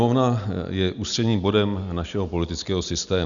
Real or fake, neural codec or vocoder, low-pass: real; none; 7.2 kHz